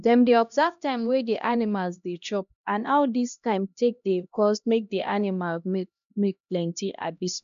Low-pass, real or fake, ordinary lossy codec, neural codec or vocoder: 7.2 kHz; fake; none; codec, 16 kHz, 1 kbps, X-Codec, HuBERT features, trained on LibriSpeech